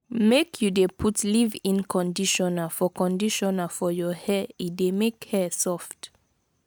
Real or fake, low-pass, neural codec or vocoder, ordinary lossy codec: real; none; none; none